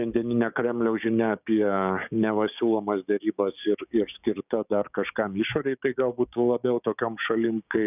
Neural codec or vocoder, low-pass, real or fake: codec, 16 kHz, 6 kbps, DAC; 3.6 kHz; fake